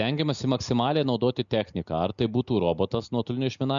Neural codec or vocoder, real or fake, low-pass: none; real; 7.2 kHz